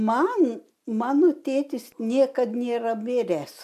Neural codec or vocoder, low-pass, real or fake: none; 14.4 kHz; real